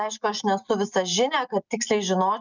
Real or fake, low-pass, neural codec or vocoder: real; 7.2 kHz; none